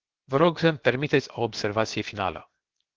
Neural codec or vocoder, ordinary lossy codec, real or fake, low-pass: codec, 16 kHz, 0.7 kbps, FocalCodec; Opus, 16 kbps; fake; 7.2 kHz